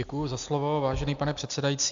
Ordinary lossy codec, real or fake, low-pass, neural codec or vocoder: MP3, 64 kbps; real; 7.2 kHz; none